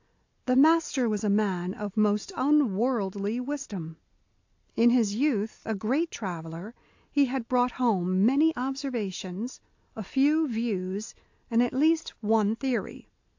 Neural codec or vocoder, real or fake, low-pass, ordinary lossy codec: none; real; 7.2 kHz; AAC, 48 kbps